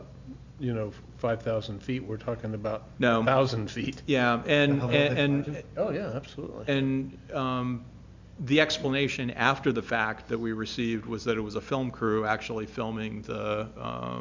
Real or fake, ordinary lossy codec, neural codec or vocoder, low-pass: real; MP3, 64 kbps; none; 7.2 kHz